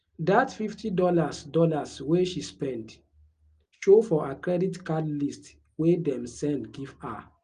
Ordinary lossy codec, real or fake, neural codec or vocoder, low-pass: Opus, 24 kbps; real; none; 9.9 kHz